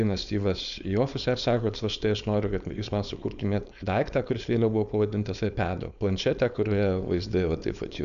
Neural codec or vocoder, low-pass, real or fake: codec, 16 kHz, 4.8 kbps, FACodec; 7.2 kHz; fake